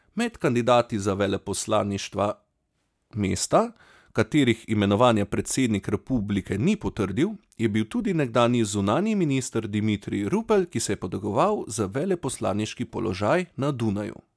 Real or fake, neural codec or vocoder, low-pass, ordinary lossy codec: real; none; none; none